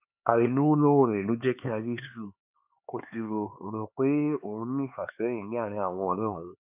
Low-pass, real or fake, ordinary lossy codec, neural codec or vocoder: 3.6 kHz; fake; none; codec, 16 kHz, 4 kbps, X-Codec, HuBERT features, trained on LibriSpeech